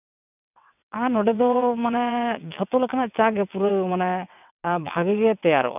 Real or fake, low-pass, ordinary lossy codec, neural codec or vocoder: fake; 3.6 kHz; none; vocoder, 22.05 kHz, 80 mel bands, WaveNeXt